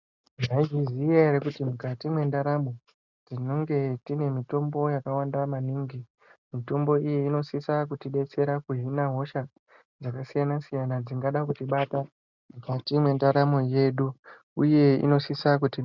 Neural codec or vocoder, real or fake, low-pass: none; real; 7.2 kHz